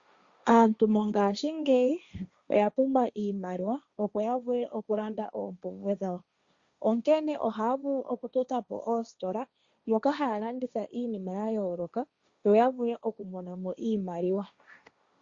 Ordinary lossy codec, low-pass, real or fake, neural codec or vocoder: Opus, 64 kbps; 7.2 kHz; fake; codec, 16 kHz, 1.1 kbps, Voila-Tokenizer